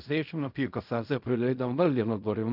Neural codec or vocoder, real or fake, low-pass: codec, 16 kHz in and 24 kHz out, 0.4 kbps, LongCat-Audio-Codec, fine tuned four codebook decoder; fake; 5.4 kHz